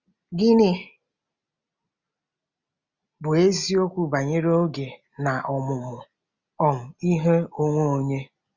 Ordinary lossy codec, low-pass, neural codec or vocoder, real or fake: Opus, 64 kbps; 7.2 kHz; none; real